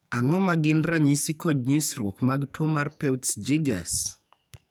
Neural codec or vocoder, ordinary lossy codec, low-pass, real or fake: codec, 44.1 kHz, 2.6 kbps, SNAC; none; none; fake